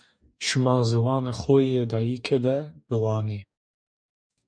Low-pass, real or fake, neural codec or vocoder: 9.9 kHz; fake; codec, 44.1 kHz, 2.6 kbps, DAC